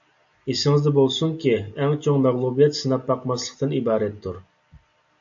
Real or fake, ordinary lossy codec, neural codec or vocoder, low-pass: real; AAC, 64 kbps; none; 7.2 kHz